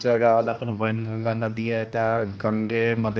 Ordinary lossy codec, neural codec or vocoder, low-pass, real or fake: none; codec, 16 kHz, 1 kbps, X-Codec, HuBERT features, trained on general audio; none; fake